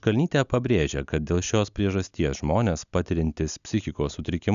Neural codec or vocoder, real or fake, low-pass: none; real; 7.2 kHz